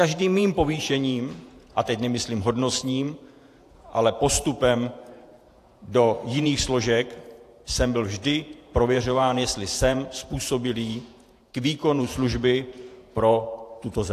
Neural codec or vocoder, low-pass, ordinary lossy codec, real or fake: vocoder, 48 kHz, 128 mel bands, Vocos; 14.4 kHz; AAC, 64 kbps; fake